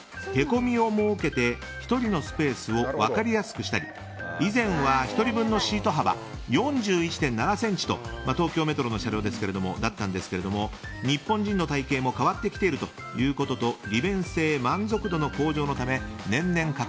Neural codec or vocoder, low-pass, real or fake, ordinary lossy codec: none; none; real; none